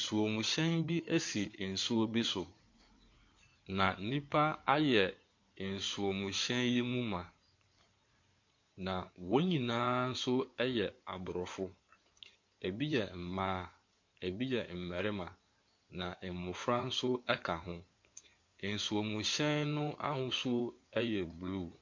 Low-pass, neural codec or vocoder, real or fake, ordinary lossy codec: 7.2 kHz; codec, 16 kHz in and 24 kHz out, 2.2 kbps, FireRedTTS-2 codec; fake; MP3, 48 kbps